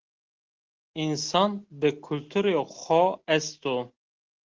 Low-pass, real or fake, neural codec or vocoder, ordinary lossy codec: 7.2 kHz; real; none; Opus, 16 kbps